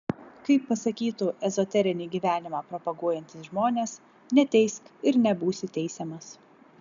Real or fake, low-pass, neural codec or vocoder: real; 7.2 kHz; none